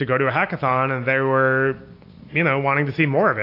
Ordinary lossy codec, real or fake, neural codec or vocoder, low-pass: AAC, 32 kbps; real; none; 5.4 kHz